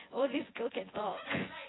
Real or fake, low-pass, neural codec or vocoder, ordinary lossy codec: fake; 7.2 kHz; vocoder, 24 kHz, 100 mel bands, Vocos; AAC, 16 kbps